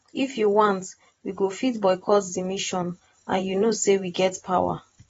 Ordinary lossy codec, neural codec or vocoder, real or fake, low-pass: AAC, 24 kbps; vocoder, 44.1 kHz, 128 mel bands every 512 samples, BigVGAN v2; fake; 19.8 kHz